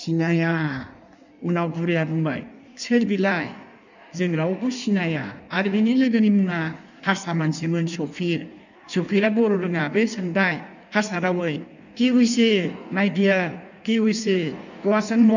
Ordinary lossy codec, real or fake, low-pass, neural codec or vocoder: none; fake; 7.2 kHz; codec, 16 kHz in and 24 kHz out, 1.1 kbps, FireRedTTS-2 codec